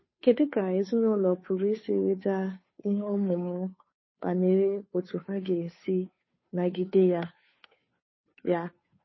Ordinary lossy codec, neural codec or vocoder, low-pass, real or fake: MP3, 24 kbps; codec, 16 kHz, 2 kbps, FunCodec, trained on Chinese and English, 25 frames a second; 7.2 kHz; fake